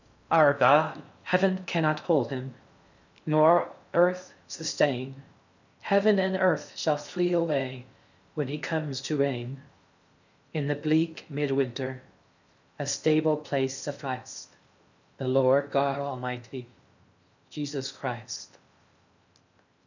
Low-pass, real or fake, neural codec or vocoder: 7.2 kHz; fake; codec, 16 kHz in and 24 kHz out, 0.6 kbps, FocalCodec, streaming, 4096 codes